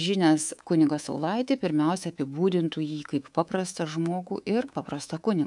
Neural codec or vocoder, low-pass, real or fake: codec, 24 kHz, 3.1 kbps, DualCodec; 10.8 kHz; fake